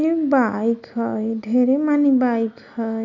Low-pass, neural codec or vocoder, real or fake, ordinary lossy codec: 7.2 kHz; none; real; none